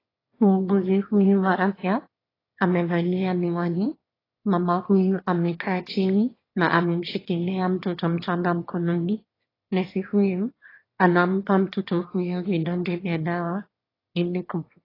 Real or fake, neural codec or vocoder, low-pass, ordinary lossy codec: fake; autoencoder, 22.05 kHz, a latent of 192 numbers a frame, VITS, trained on one speaker; 5.4 kHz; AAC, 24 kbps